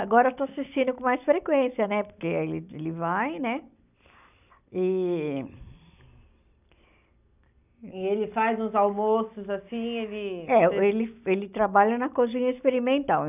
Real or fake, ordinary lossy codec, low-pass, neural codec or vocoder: real; none; 3.6 kHz; none